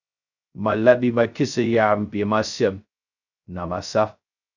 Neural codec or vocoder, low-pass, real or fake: codec, 16 kHz, 0.2 kbps, FocalCodec; 7.2 kHz; fake